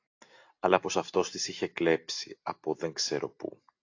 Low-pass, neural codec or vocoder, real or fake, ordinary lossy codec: 7.2 kHz; none; real; AAC, 48 kbps